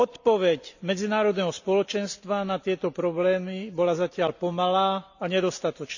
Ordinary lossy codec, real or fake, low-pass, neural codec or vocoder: none; real; 7.2 kHz; none